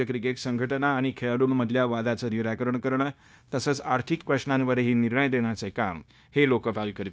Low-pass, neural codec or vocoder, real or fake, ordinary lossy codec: none; codec, 16 kHz, 0.9 kbps, LongCat-Audio-Codec; fake; none